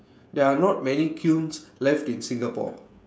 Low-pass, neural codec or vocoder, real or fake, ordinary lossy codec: none; codec, 16 kHz, 16 kbps, FreqCodec, smaller model; fake; none